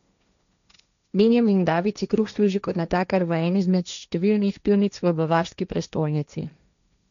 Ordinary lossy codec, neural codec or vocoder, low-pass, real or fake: none; codec, 16 kHz, 1.1 kbps, Voila-Tokenizer; 7.2 kHz; fake